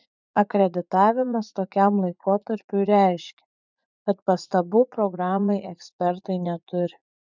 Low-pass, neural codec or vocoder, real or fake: 7.2 kHz; vocoder, 44.1 kHz, 80 mel bands, Vocos; fake